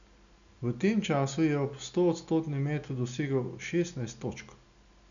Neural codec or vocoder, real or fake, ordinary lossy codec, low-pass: none; real; none; 7.2 kHz